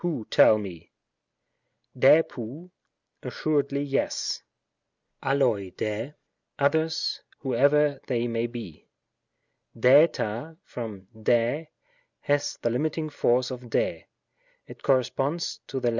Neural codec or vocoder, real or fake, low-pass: none; real; 7.2 kHz